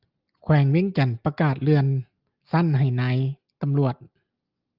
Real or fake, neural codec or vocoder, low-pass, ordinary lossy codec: real; none; 5.4 kHz; Opus, 24 kbps